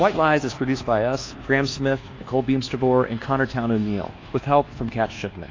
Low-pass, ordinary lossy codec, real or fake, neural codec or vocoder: 7.2 kHz; AAC, 32 kbps; fake; codec, 24 kHz, 1.2 kbps, DualCodec